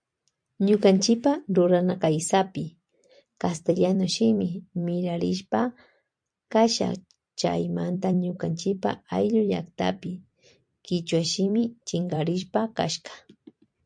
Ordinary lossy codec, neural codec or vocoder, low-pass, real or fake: MP3, 64 kbps; vocoder, 44.1 kHz, 128 mel bands every 256 samples, BigVGAN v2; 9.9 kHz; fake